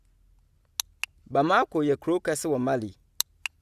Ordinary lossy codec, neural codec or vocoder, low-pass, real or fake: Opus, 64 kbps; none; 14.4 kHz; real